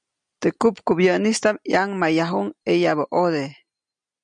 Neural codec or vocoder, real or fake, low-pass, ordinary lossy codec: none; real; 9.9 kHz; MP3, 96 kbps